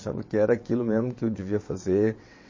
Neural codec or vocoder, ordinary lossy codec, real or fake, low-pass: vocoder, 22.05 kHz, 80 mel bands, WaveNeXt; MP3, 32 kbps; fake; 7.2 kHz